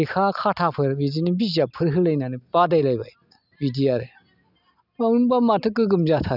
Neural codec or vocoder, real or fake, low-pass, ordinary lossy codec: none; real; 5.4 kHz; none